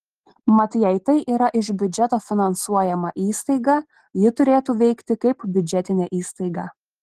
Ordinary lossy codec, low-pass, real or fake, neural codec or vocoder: Opus, 16 kbps; 14.4 kHz; real; none